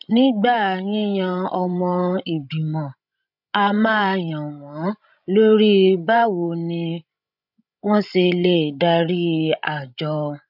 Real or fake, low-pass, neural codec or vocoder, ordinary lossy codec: fake; 5.4 kHz; codec, 16 kHz, 16 kbps, FreqCodec, larger model; none